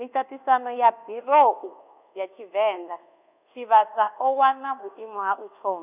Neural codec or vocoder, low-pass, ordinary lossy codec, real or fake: codec, 24 kHz, 1.2 kbps, DualCodec; 3.6 kHz; none; fake